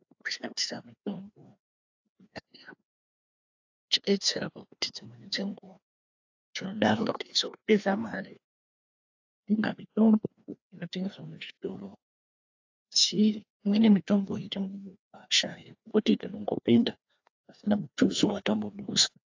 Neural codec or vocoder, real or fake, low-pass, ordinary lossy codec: codec, 16 kHz, 2 kbps, FreqCodec, larger model; fake; 7.2 kHz; AAC, 48 kbps